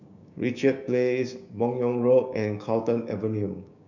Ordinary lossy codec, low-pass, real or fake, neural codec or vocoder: none; 7.2 kHz; fake; codec, 16 kHz, 6 kbps, DAC